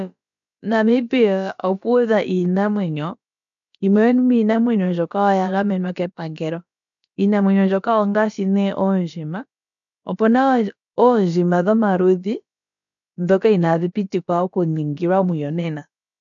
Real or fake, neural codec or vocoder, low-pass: fake; codec, 16 kHz, about 1 kbps, DyCAST, with the encoder's durations; 7.2 kHz